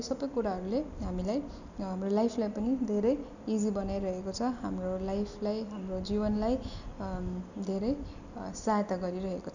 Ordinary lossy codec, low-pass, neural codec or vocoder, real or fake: none; 7.2 kHz; none; real